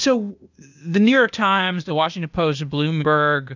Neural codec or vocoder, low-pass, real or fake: codec, 16 kHz, 0.8 kbps, ZipCodec; 7.2 kHz; fake